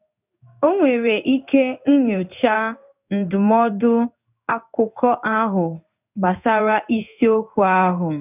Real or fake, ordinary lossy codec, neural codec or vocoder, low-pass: fake; none; codec, 16 kHz in and 24 kHz out, 1 kbps, XY-Tokenizer; 3.6 kHz